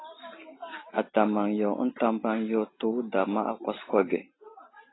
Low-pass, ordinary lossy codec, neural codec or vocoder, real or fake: 7.2 kHz; AAC, 16 kbps; none; real